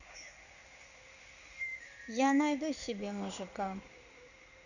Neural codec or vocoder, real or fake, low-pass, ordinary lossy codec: codec, 16 kHz in and 24 kHz out, 1 kbps, XY-Tokenizer; fake; 7.2 kHz; none